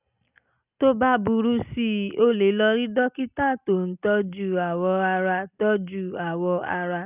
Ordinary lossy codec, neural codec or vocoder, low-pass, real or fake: none; none; 3.6 kHz; real